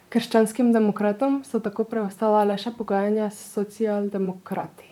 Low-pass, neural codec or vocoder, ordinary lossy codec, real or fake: 19.8 kHz; vocoder, 44.1 kHz, 128 mel bands, Pupu-Vocoder; none; fake